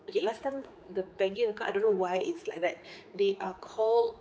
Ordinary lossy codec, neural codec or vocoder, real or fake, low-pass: none; codec, 16 kHz, 4 kbps, X-Codec, HuBERT features, trained on general audio; fake; none